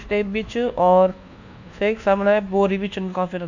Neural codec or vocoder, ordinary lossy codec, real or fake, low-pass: codec, 24 kHz, 1.2 kbps, DualCodec; none; fake; 7.2 kHz